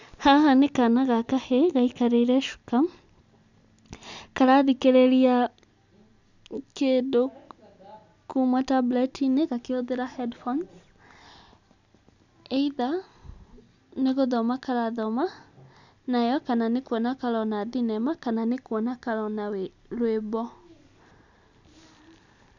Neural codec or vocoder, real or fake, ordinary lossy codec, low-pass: none; real; none; 7.2 kHz